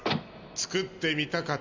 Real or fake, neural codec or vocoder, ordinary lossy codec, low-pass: real; none; MP3, 64 kbps; 7.2 kHz